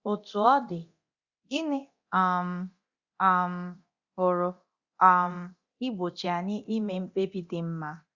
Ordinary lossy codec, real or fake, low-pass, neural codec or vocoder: Opus, 64 kbps; fake; 7.2 kHz; codec, 24 kHz, 0.9 kbps, DualCodec